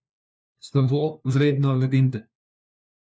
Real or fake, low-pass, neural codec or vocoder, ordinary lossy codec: fake; none; codec, 16 kHz, 1 kbps, FunCodec, trained on LibriTTS, 50 frames a second; none